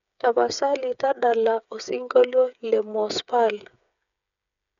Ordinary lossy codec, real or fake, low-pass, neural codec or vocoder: none; fake; 7.2 kHz; codec, 16 kHz, 8 kbps, FreqCodec, smaller model